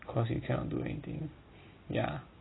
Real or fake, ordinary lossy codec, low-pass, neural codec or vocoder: real; AAC, 16 kbps; 7.2 kHz; none